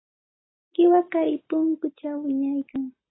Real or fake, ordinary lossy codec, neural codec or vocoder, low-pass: real; AAC, 16 kbps; none; 7.2 kHz